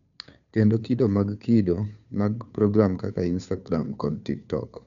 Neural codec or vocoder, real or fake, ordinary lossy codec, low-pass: codec, 16 kHz, 2 kbps, FunCodec, trained on Chinese and English, 25 frames a second; fake; none; 7.2 kHz